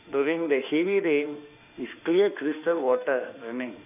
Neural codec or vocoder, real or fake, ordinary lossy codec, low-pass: autoencoder, 48 kHz, 32 numbers a frame, DAC-VAE, trained on Japanese speech; fake; none; 3.6 kHz